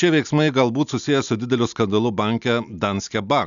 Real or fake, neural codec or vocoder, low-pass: real; none; 7.2 kHz